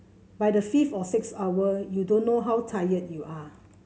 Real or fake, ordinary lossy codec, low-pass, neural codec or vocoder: real; none; none; none